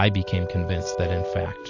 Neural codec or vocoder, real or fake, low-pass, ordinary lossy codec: none; real; 7.2 kHz; AAC, 32 kbps